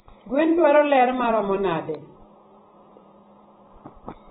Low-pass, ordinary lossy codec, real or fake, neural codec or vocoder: 19.8 kHz; AAC, 16 kbps; fake; vocoder, 44.1 kHz, 128 mel bands every 256 samples, BigVGAN v2